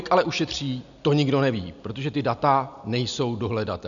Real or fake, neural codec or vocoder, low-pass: real; none; 7.2 kHz